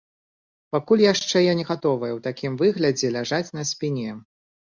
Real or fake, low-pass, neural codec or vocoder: real; 7.2 kHz; none